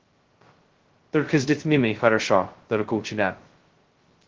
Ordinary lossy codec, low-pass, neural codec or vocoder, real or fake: Opus, 16 kbps; 7.2 kHz; codec, 16 kHz, 0.2 kbps, FocalCodec; fake